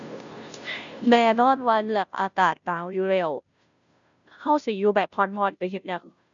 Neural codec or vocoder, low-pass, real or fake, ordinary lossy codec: codec, 16 kHz, 0.5 kbps, FunCodec, trained on Chinese and English, 25 frames a second; 7.2 kHz; fake; none